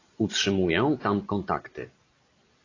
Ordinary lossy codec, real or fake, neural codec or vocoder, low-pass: AAC, 32 kbps; real; none; 7.2 kHz